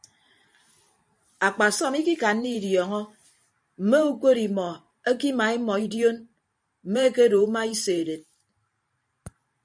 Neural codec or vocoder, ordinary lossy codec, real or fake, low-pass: vocoder, 44.1 kHz, 128 mel bands every 512 samples, BigVGAN v2; MP3, 96 kbps; fake; 9.9 kHz